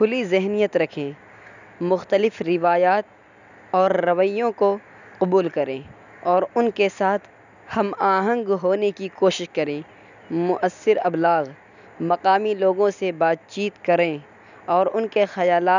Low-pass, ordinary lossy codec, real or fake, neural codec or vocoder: 7.2 kHz; none; real; none